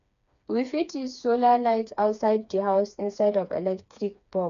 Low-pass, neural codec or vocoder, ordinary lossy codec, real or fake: 7.2 kHz; codec, 16 kHz, 4 kbps, FreqCodec, smaller model; none; fake